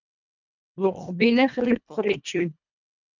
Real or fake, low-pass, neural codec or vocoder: fake; 7.2 kHz; codec, 24 kHz, 1.5 kbps, HILCodec